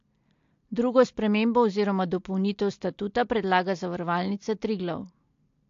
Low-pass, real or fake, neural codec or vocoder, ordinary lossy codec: 7.2 kHz; real; none; AAC, 48 kbps